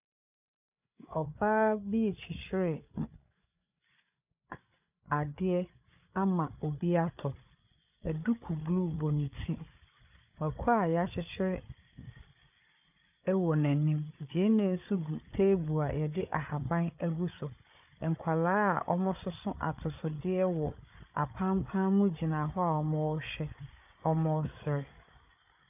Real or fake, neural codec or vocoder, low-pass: fake; codec, 16 kHz, 8 kbps, FreqCodec, larger model; 3.6 kHz